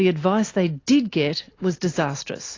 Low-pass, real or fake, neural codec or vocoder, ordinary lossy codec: 7.2 kHz; real; none; AAC, 32 kbps